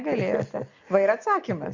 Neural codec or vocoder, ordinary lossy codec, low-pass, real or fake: none; Opus, 64 kbps; 7.2 kHz; real